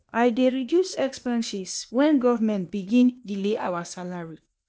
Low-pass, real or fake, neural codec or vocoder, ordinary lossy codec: none; fake; codec, 16 kHz, 0.8 kbps, ZipCodec; none